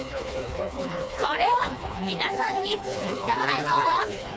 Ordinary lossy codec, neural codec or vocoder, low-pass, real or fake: none; codec, 16 kHz, 2 kbps, FreqCodec, smaller model; none; fake